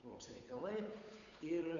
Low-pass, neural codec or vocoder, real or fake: 7.2 kHz; codec, 16 kHz, 8 kbps, FunCodec, trained on Chinese and English, 25 frames a second; fake